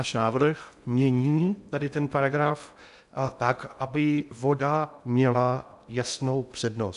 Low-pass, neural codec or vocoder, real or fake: 10.8 kHz; codec, 16 kHz in and 24 kHz out, 0.6 kbps, FocalCodec, streaming, 2048 codes; fake